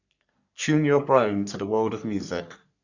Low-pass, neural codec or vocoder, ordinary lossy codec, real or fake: 7.2 kHz; codec, 44.1 kHz, 3.4 kbps, Pupu-Codec; none; fake